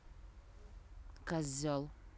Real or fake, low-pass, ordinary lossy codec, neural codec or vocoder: real; none; none; none